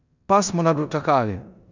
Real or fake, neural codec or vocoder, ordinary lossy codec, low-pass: fake; codec, 16 kHz in and 24 kHz out, 0.9 kbps, LongCat-Audio-Codec, four codebook decoder; none; 7.2 kHz